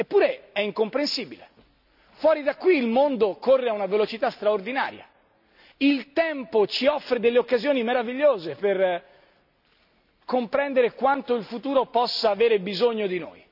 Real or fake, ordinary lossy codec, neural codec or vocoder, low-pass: real; none; none; 5.4 kHz